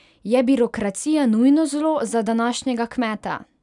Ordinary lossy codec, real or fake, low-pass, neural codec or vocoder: none; real; 10.8 kHz; none